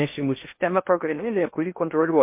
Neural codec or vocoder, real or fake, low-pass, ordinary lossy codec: codec, 16 kHz in and 24 kHz out, 0.6 kbps, FocalCodec, streaming, 2048 codes; fake; 3.6 kHz; MP3, 32 kbps